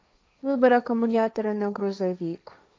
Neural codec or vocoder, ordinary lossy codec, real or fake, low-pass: codec, 16 kHz, 1.1 kbps, Voila-Tokenizer; none; fake; none